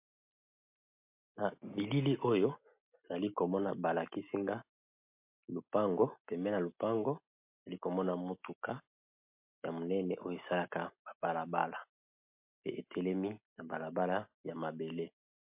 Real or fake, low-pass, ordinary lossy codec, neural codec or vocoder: real; 3.6 kHz; MP3, 32 kbps; none